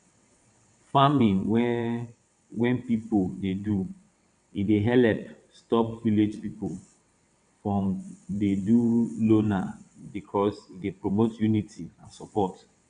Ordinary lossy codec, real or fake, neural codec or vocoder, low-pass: none; fake; vocoder, 22.05 kHz, 80 mel bands, WaveNeXt; 9.9 kHz